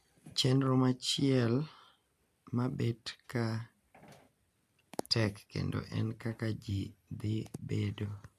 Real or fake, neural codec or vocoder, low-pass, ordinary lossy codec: real; none; 14.4 kHz; MP3, 96 kbps